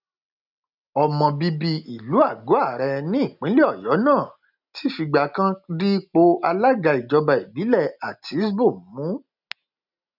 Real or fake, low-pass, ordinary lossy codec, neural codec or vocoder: real; 5.4 kHz; none; none